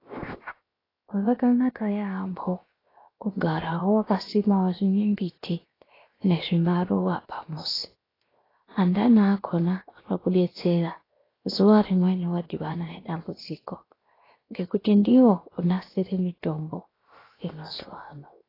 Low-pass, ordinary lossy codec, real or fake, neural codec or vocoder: 5.4 kHz; AAC, 24 kbps; fake; codec, 16 kHz, 0.7 kbps, FocalCodec